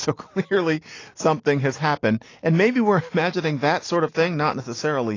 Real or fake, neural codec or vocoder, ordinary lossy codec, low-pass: real; none; AAC, 32 kbps; 7.2 kHz